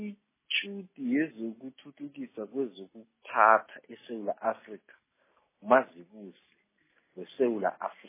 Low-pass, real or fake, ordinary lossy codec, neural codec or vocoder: 3.6 kHz; real; MP3, 16 kbps; none